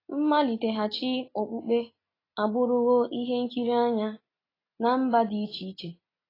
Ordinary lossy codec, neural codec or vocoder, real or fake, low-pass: AAC, 24 kbps; none; real; 5.4 kHz